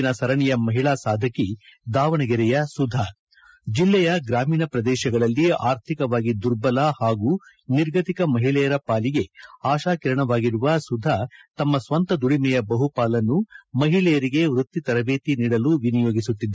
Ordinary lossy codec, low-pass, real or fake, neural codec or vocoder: none; none; real; none